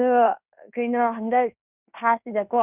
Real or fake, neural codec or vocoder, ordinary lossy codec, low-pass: fake; codec, 16 kHz in and 24 kHz out, 0.9 kbps, LongCat-Audio-Codec, fine tuned four codebook decoder; none; 3.6 kHz